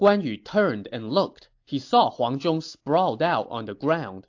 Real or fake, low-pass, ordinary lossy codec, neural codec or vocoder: real; 7.2 kHz; AAC, 48 kbps; none